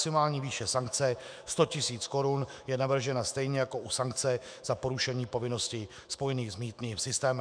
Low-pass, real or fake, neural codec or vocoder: 9.9 kHz; fake; autoencoder, 48 kHz, 128 numbers a frame, DAC-VAE, trained on Japanese speech